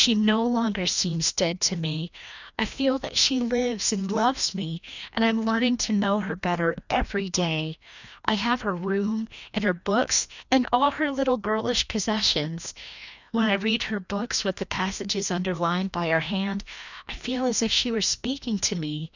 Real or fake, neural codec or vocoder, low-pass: fake; codec, 16 kHz, 1 kbps, FreqCodec, larger model; 7.2 kHz